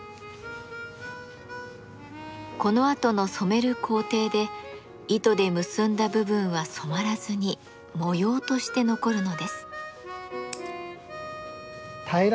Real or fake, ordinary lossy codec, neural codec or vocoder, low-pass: real; none; none; none